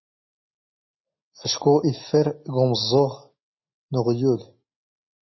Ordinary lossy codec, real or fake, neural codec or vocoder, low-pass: MP3, 24 kbps; real; none; 7.2 kHz